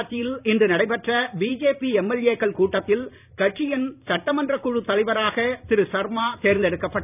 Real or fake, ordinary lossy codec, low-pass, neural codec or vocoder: fake; none; 3.6 kHz; vocoder, 44.1 kHz, 128 mel bands every 256 samples, BigVGAN v2